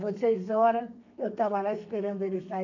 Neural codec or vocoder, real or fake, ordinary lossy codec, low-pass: codec, 32 kHz, 1.9 kbps, SNAC; fake; none; 7.2 kHz